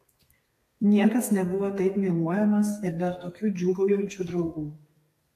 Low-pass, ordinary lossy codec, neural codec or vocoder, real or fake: 14.4 kHz; AAC, 64 kbps; codec, 32 kHz, 1.9 kbps, SNAC; fake